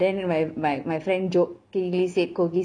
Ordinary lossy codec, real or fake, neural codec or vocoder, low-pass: none; real; none; 9.9 kHz